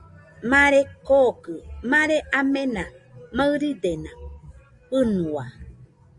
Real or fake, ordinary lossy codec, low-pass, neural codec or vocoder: real; Opus, 64 kbps; 10.8 kHz; none